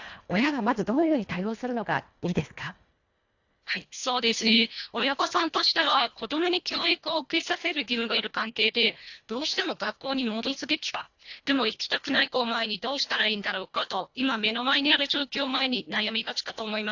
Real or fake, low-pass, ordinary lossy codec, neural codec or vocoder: fake; 7.2 kHz; AAC, 48 kbps; codec, 24 kHz, 1.5 kbps, HILCodec